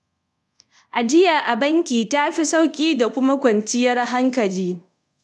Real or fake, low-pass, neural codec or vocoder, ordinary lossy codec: fake; none; codec, 24 kHz, 0.5 kbps, DualCodec; none